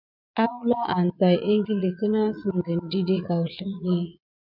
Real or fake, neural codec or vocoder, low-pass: fake; vocoder, 22.05 kHz, 80 mel bands, Vocos; 5.4 kHz